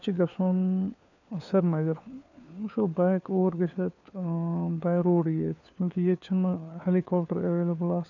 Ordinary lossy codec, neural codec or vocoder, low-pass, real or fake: none; codec, 16 kHz, 4 kbps, FunCodec, trained on LibriTTS, 50 frames a second; 7.2 kHz; fake